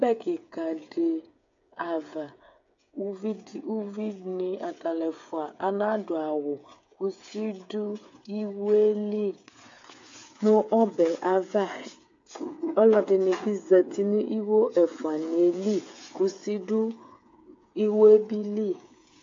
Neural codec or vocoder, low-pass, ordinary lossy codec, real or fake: codec, 16 kHz, 8 kbps, FreqCodec, smaller model; 7.2 kHz; AAC, 48 kbps; fake